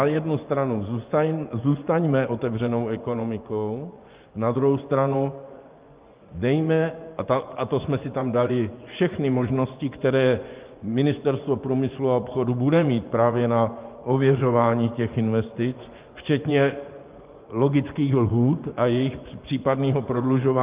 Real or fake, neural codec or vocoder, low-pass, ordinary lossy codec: fake; vocoder, 24 kHz, 100 mel bands, Vocos; 3.6 kHz; Opus, 32 kbps